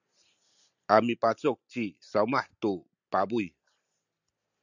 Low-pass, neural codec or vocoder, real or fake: 7.2 kHz; none; real